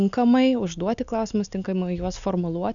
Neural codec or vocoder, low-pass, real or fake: none; 7.2 kHz; real